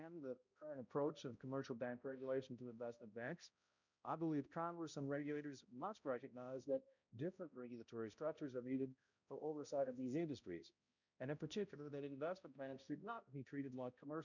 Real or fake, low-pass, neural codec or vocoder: fake; 7.2 kHz; codec, 16 kHz, 0.5 kbps, X-Codec, HuBERT features, trained on balanced general audio